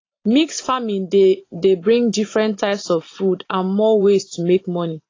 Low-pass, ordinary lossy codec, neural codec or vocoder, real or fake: 7.2 kHz; AAC, 32 kbps; vocoder, 44.1 kHz, 128 mel bands every 512 samples, BigVGAN v2; fake